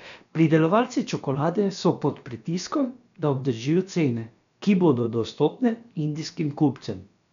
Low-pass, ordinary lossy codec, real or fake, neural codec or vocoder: 7.2 kHz; MP3, 96 kbps; fake; codec, 16 kHz, about 1 kbps, DyCAST, with the encoder's durations